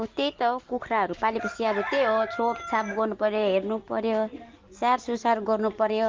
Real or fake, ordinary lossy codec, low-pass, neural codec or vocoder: real; Opus, 32 kbps; 7.2 kHz; none